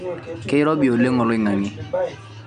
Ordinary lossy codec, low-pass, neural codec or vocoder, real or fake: none; 9.9 kHz; none; real